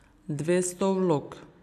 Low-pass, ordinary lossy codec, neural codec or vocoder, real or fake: 14.4 kHz; none; none; real